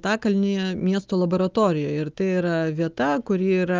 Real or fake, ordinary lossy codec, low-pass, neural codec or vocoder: real; Opus, 32 kbps; 7.2 kHz; none